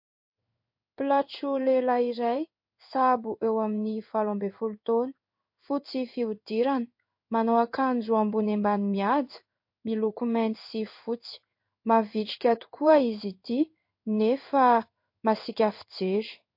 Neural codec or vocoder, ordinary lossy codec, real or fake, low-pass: codec, 16 kHz in and 24 kHz out, 1 kbps, XY-Tokenizer; MP3, 32 kbps; fake; 5.4 kHz